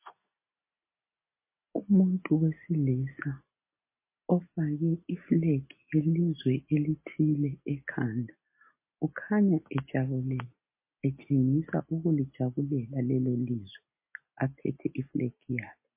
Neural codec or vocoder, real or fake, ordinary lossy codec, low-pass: none; real; MP3, 24 kbps; 3.6 kHz